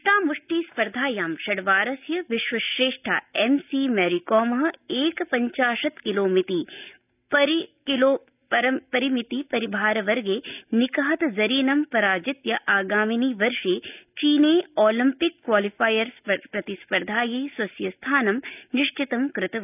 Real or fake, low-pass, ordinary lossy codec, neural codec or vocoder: real; 3.6 kHz; none; none